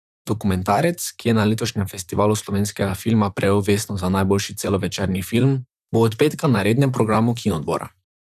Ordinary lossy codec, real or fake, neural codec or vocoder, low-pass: none; fake; vocoder, 44.1 kHz, 128 mel bands, Pupu-Vocoder; 14.4 kHz